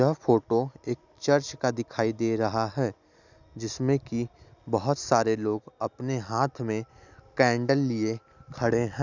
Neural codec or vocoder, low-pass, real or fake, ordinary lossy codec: none; 7.2 kHz; real; none